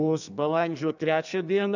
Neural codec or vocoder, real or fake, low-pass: codec, 32 kHz, 1.9 kbps, SNAC; fake; 7.2 kHz